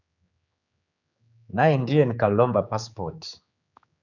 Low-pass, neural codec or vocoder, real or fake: 7.2 kHz; codec, 16 kHz, 4 kbps, X-Codec, HuBERT features, trained on general audio; fake